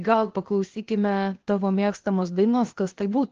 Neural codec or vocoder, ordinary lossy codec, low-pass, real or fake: codec, 16 kHz, 0.8 kbps, ZipCodec; Opus, 16 kbps; 7.2 kHz; fake